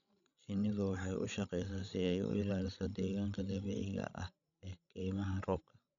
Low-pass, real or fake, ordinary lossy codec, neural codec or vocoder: 7.2 kHz; fake; MP3, 96 kbps; codec, 16 kHz, 16 kbps, FreqCodec, larger model